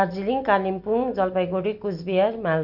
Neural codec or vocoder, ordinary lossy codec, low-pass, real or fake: none; none; 5.4 kHz; real